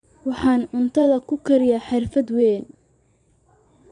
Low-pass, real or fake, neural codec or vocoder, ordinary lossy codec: 9.9 kHz; fake; vocoder, 22.05 kHz, 80 mel bands, Vocos; MP3, 96 kbps